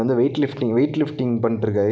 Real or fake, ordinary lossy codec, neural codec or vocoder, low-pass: real; none; none; none